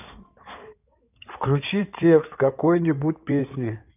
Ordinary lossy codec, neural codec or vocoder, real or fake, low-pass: none; codec, 16 kHz in and 24 kHz out, 2.2 kbps, FireRedTTS-2 codec; fake; 3.6 kHz